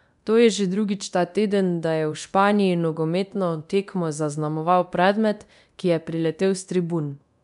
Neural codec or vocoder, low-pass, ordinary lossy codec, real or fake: codec, 24 kHz, 0.9 kbps, DualCodec; 10.8 kHz; none; fake